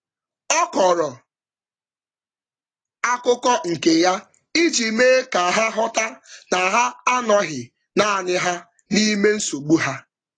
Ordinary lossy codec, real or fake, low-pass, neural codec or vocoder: AAC, 32 kbps; real; 9.9 kHz; none